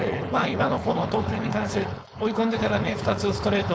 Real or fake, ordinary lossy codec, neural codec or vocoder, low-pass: fake; none; codec, 16 kHz, 4.8 kbps, FACodec; none